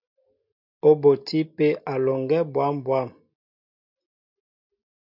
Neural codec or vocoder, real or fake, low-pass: none; real; 5.4 kHz